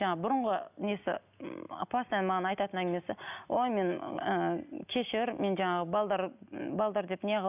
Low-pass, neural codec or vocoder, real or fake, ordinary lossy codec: 3.6 kHz; none; real; none